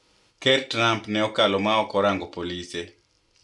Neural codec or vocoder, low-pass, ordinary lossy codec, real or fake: none; 10.8 kHz; none; real